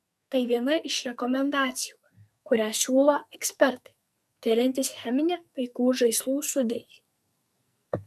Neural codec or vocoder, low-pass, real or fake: codec, 44.1 kHz, 2.6 kbps, SNAC; 14.4 kHz; fake